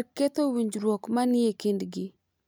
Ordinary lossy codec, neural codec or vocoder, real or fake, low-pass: none; none; real; none